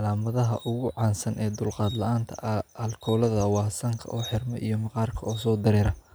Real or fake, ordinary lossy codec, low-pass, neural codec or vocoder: real; none; none; none